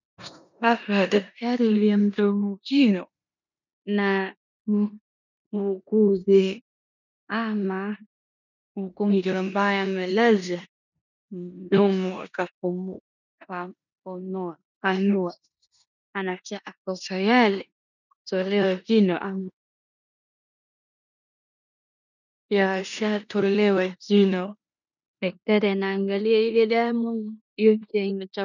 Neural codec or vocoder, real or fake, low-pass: codec, 16 kHz in and 24 kHz out, 0.9 kbps, LongCat-Audio-Codec, four codebook decoder; fake; 7.2 kHz